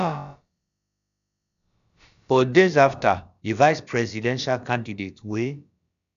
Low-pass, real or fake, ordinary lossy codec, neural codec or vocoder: 7.2 kHz; fake; none; codec, 16 kHz, about 1 kbps, DyCAST, with the encoder's durations